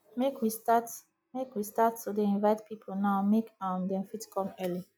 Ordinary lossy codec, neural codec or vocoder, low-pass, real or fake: none; none; none; real